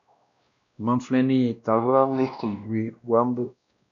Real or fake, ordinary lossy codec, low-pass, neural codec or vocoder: fake; AAC, 64 kbps; 7.2 kHz; codec, 16 kHz, 1 kbps, X-Codec, WavLM features, trained on Multilingual LibriSpeech